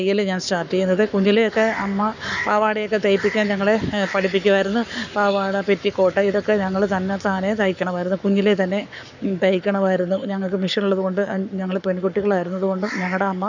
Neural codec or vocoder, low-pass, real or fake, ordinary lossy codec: codec, 44.1 kHz, 7.8 kbps, Pupu-Codec; 7.2 kHz; fake; none